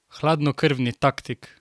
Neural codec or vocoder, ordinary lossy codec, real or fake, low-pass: none; none; real; none